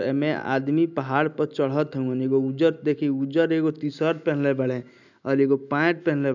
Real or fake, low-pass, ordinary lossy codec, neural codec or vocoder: real; 7.2 kHz; none; none